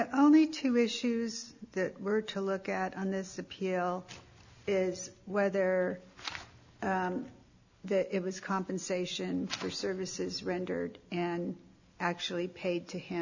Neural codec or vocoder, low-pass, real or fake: none; 7.2 kHz; real